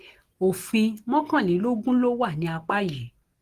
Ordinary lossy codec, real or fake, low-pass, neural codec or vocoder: Opus, 16 kbps; real; 14.4 kHz; none